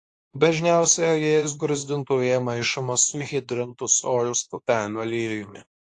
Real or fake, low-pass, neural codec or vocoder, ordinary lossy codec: fake; 10.8 kHz; codec, 24 kHz, 0.9 kbps, WavTokenizer, medium speech release version 2; AAC, 48 kbps